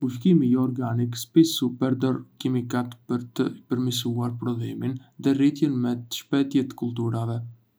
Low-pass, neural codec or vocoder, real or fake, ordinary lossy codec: none; none; real; none